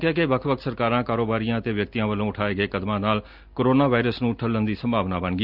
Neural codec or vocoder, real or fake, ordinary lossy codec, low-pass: none; real; Opus, 24 kbps; 5.4 kHz